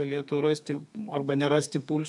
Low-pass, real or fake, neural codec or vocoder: 10.8 kHz; fake; codec, 32 kHz, 1.9 kbps, SNAC